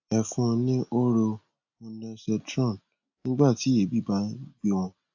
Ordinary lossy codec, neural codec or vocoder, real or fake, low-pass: AAC, 48 kbps; none; real; 7.2 kHz